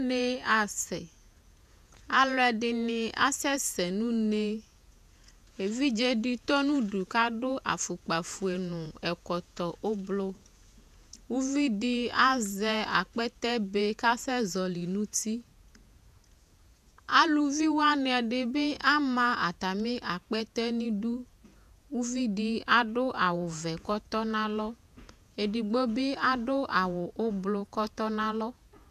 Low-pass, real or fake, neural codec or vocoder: 14.4 kHz; fake; vocoder, 48 kHz, 128 mel bands, Vocos